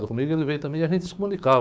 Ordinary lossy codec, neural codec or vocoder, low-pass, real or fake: none; codec, 16 kHz, 6 kbps, DAC; none; fake